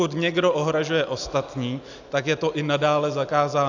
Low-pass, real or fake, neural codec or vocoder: 7.2 kHz; real; none